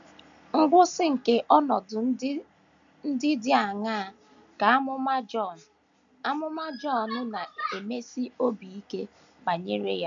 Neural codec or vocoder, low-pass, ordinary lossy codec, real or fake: none; 7.2 kHz; AAC, 64 kbps; real